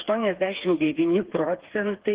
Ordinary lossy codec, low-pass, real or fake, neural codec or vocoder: Opus, 16 kbps; 3.6 kHz; fake; codec, 16 kHz, 4 kbps, FreqCodec, smaller model